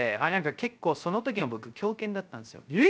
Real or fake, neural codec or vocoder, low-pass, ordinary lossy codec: fake; codec, 16 kHz, 0.3 kbps, FocalCodec; none; none